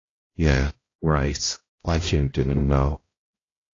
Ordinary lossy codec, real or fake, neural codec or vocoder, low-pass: AAC, 32 kbps; fake; codec, 16 kHz, 0.5 kbps, X-Codec, HuBERT features, trained on balanced general audio; 7.2 kHz